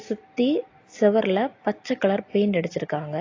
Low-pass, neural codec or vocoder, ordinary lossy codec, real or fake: 7.2 kHz; none; AAC, 32 kbps; real